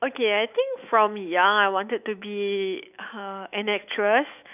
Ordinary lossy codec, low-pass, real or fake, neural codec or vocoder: none; 3.6 kHz; fake; autoencoder, 48 kHz, 128 numbers a frame, DAC-VAE, trained on Japanese speech